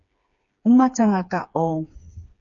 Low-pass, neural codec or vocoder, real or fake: 7.2 kHz; codec, 16 kHz, 4 kbps, FreqCodec, smaller model; fake